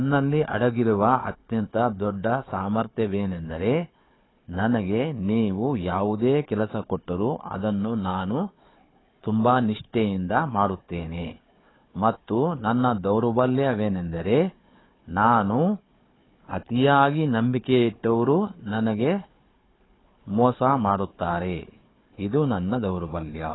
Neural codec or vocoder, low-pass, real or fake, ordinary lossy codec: codec, 16 kHz, 4 kbps, FunCodec, trained on Chinese and English, 50 frames a second; 7.2 kHz; fake; AAC, 16 kbps